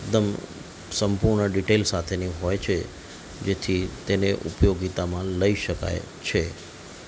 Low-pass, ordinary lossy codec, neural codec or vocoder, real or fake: none; none; none; real